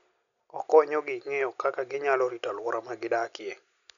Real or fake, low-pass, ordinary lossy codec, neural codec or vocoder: real; 7.2 kHz; none; none